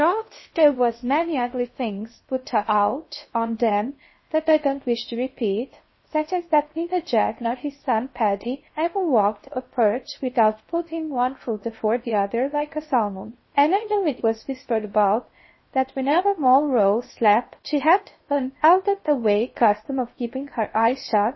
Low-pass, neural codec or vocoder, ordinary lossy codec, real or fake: 7.2 kHz; codec, 16 kHz in and 24 kHz out, 0.6 kbps, FocalCodec, streaming, 2048 codes; MP3, 24 kbps; fake